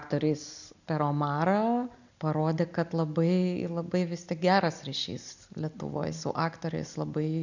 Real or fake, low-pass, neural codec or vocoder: real; 7.2 kHz; none